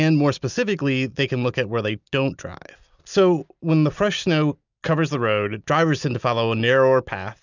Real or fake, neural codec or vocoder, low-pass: real; none; 7.2 kHz